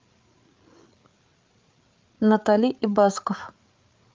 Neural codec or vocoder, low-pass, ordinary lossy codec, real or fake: codec, 16 kHz, 8 kbps, FreqCodec, larger model; 7.2 kHz; Opus, 32 kbps; fake